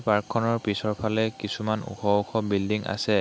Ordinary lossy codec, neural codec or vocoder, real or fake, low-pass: none; none; real; none